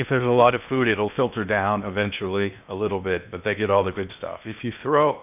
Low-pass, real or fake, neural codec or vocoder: 3.6 kHz; fake; codec, 16 kHz in and 24 kHz out, 0.8 kbps, FocalCodec, streaming, 65536 codes